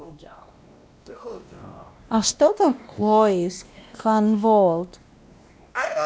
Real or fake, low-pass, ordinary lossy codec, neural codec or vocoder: fake; none; none; codec, 16 kHz, 1 kbps, X-Codec, WavLM features, trained on Multilingual LibriSpeech